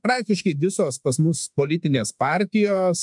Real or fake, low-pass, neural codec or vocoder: fake; 10.8 kHz; autoencoder, 48 kHz, 32 numbers a frame, DAC-VAE, trained on Japanese speech